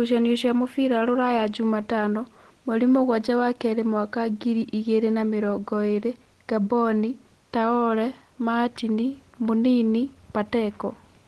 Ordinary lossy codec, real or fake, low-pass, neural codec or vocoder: Opus, 16 kbps; real; 10.8 kHz; none